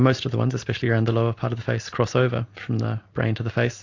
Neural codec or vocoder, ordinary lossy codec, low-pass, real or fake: none; AAC, 48 kbps; 7.2 kHz; real